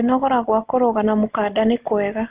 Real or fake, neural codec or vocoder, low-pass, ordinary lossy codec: real; none; 3.6 kHz; Opus, 24 kbps